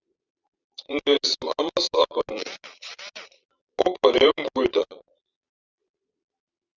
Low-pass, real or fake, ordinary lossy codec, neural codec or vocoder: 7.2 kHz; fake; Opus, 64 kbps; vocoder, 22.05 kHz, 80 mel bands, Vocos